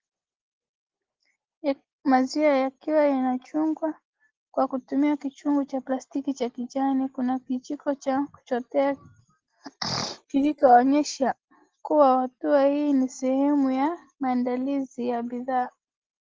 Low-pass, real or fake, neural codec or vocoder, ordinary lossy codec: 7.2 kHz; real; none; Opus, 16 kbps